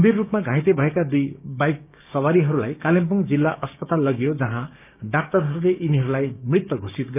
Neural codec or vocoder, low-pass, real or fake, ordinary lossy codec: codec, 16 kHz, 6 kbps, DAC; 3.6 kHz; fake; none